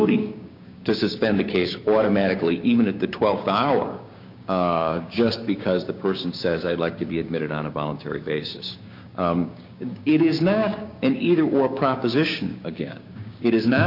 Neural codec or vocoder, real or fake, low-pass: codec, 16 kHz, 6 kbps, DAC; fake; 5.4 kHz